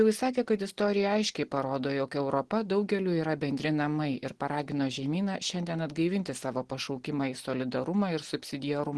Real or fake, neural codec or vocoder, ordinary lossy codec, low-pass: real; none; Opus, 16 kbps; 10.8 kHz